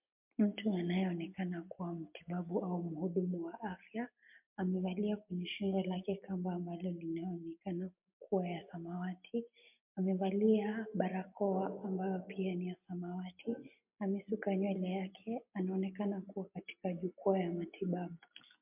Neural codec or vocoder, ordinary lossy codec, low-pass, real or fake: none; MP3, 32 kbps; 3.6 kHz; real